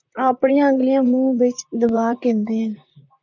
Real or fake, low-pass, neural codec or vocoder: fake; 7.2 kHz; vocoder, 44.1 kHz, 128 mel bands, Pupu-Vocoder